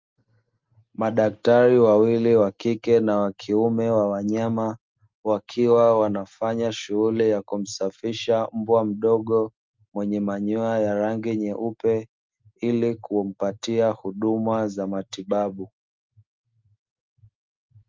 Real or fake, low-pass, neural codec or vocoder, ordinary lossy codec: real; 7.2 kHz; none; Opus, 24 kbps